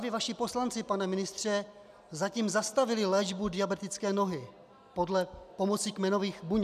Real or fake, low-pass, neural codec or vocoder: real; 14.4 kHz; none